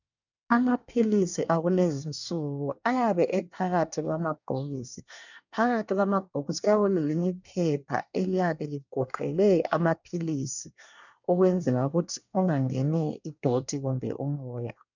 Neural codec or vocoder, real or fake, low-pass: codec, 24 kHz, 1 kbps, SNAC; fake; 7.2 kHz